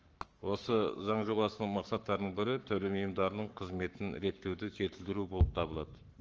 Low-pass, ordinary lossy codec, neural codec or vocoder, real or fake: 7.2 kHz; Opus, 24 kbps; codec, 44.1 kHz, 7.8 kbps, Pupu-Codec; fake